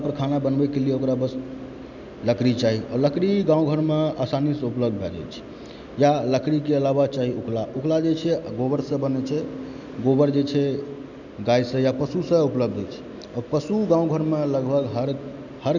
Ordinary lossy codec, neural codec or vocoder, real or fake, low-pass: none; none; real; 7.2 kHz